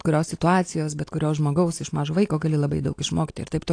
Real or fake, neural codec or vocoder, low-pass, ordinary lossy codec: real; none; 9.9 kHz; AAC, 48 kbps